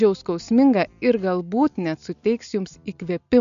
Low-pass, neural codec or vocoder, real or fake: 7.2 kHz; none; real